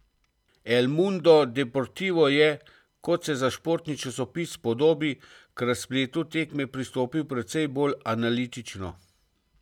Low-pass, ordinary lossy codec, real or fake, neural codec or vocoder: 19.8 kHz; none; real; none